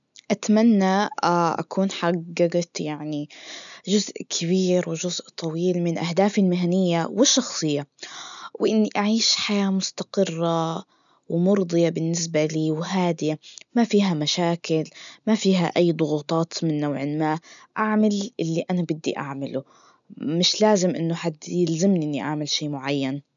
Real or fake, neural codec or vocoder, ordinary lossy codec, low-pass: real; none; none; 7.2 kHz